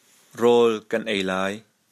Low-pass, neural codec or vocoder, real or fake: 14.4 kHz; none; real